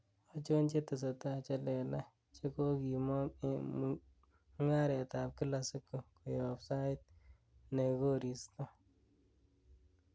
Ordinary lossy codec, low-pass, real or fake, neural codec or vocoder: none; none; real; none